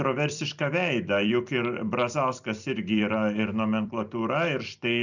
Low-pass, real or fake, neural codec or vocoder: 7.2 kHz; fake; vocoder, 44.1 kHz, 128 mel bands every 256 samples, BigVGAN v2